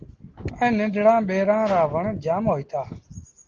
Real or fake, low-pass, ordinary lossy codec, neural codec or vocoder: real; 7.2 kHz; Opus, 16 kbps; none